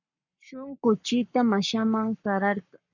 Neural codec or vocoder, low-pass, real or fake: codec, 44.1 kHz, 7.8 kbps, Pupu-Codec; 7.2 kHz; fake